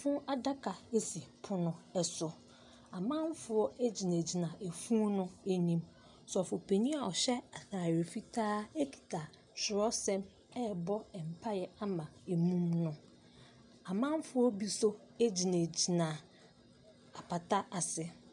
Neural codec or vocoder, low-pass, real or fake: none; 10.8 kHz; real